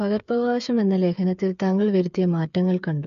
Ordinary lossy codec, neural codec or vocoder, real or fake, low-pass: MP3, 64 kbps; codec, 16 kHz, 8 kbps, FreqCodec, smaller model; fake; 7.2 kHz